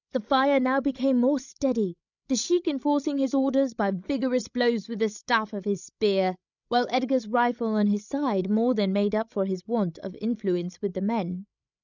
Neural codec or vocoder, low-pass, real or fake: codec, 16 kHz, 16 kbps, FreqCodec, larger model; 7.2 kHz; fake